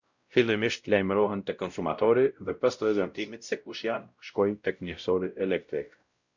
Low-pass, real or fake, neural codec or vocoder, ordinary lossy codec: 7.2 kHz; fake; codec, 16 kHz, 0.5 kbps, X-Codec, WavLM features, trained on Multilingual LibriSpeech; Opus, 64 kbps